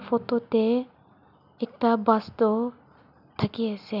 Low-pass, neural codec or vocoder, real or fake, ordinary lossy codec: 5.4 kHz; none; real; none